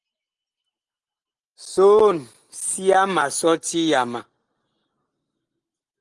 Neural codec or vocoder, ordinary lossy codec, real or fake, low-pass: none; Opus, 24 kbps; real; 10.8 kHz